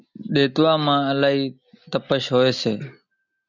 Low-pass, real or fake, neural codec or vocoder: 7.2 kHz; real; none